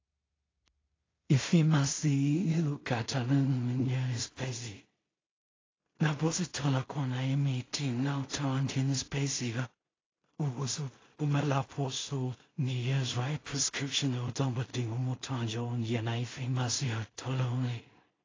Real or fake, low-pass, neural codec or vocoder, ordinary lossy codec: fake; 7.2 kHz; codec, 16 kHz in and 24 kHz out, 0.4 kbps, LongCat-Audio-Codec, two codebook decoder; AAC, 32 kbps